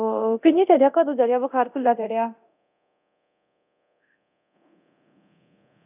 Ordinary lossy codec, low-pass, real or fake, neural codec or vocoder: none; 3.6 kHz; fake; codec, 24 kHz, 0.9 kbps, DualCodec